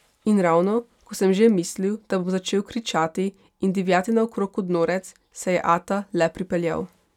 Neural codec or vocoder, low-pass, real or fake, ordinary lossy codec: none; 19.8 kHz; real; none